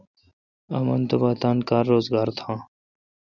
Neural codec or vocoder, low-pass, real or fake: none; 7.2 kHz; real